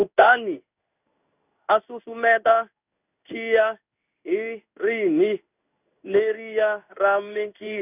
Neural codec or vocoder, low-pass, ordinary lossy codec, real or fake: codec, 16 kHz in and 24 kHz out, 1 kbps, XY-Tokenizer; 3.6 kHz; none; fake